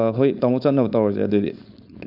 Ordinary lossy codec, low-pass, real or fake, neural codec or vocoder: none; 5.4 kHz; fake; codec, 16 kHz, 4.8 kbps, FACodec